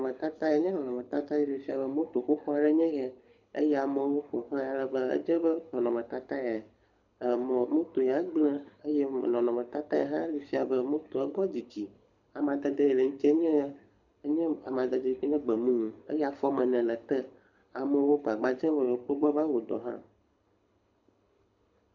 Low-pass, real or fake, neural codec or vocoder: 7.2 kHz; fake; codec, 24 kHz, 6 kbps, HILCodec